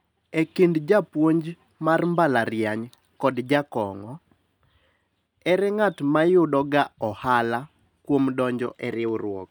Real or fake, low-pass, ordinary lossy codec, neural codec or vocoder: real; none; none; none